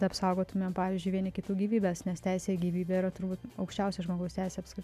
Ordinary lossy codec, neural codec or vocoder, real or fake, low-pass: MP3, 96 kbps; none; real; 14.4 kHz